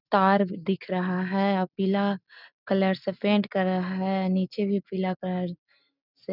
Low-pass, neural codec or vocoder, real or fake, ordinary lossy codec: 5.4 kHz; none; real; none